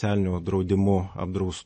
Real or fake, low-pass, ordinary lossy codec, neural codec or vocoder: real; 10.8 kHz; MP3, 32 kbps; none